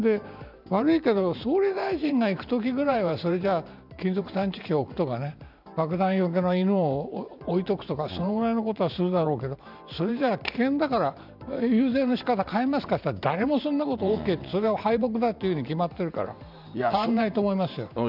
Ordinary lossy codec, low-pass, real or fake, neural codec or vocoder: none; 5.4 kHz; fake; vocoder, 44.1 kHz, 128 mel bands every 512 samples, BigVGAN v2